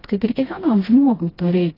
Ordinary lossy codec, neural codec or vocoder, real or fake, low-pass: AAC, 24 kbps; codec, 16 kHz, 1 kbps, FreqCodec, smaller model; fake; 5.4 kHz